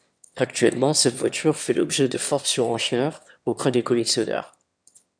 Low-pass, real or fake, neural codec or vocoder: 9.9 kHz; fake; autoencoder, 22.05 kHz, a latent of 192 numbers a frame, VITS, trained on one speaker